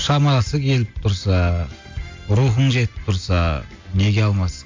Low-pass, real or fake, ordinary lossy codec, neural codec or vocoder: 7.2 kHz; real; MP3, 48 kbps; none